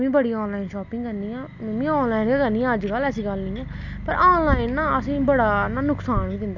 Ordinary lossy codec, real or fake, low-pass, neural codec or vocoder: none; real; 7.2 kHz; none